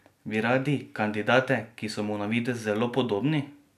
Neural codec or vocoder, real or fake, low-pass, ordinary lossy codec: none; real; 14.4 kHz; none